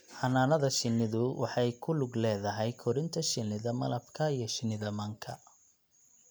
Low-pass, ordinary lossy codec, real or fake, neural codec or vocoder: none; none; real; none